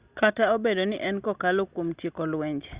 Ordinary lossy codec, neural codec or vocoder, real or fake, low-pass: Opus, 64 kbps; none; real; 3.6 kHz